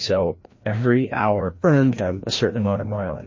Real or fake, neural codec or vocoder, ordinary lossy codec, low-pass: fake; codec, 16 kHz, 1 kbps, FreqCodec, larger model; MP3, 32 kbps; 7.2 kHz